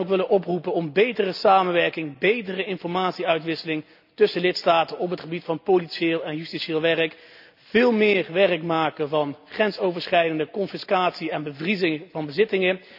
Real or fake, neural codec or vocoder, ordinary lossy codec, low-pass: real; none; none; 5.4 kHz